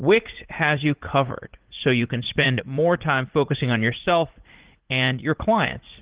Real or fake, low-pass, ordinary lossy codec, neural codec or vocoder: fake; 3.6 kHz; Opus, 16 kbps; vocoder, 44.1 kHz, 80 mel bands, Vocos